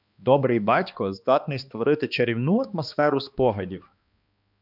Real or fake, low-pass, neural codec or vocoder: fake; 5.4 kHz; codec, 16 kHz, 2 kbps, X-Codec, HuBERT features, trained on balanced general audio